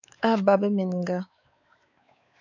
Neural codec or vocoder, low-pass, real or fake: codec, 16 kHz, 4 kbps, X-Codec, WavLM features, trained on Multilingual LibriSpeech; 7.2 kHz; fake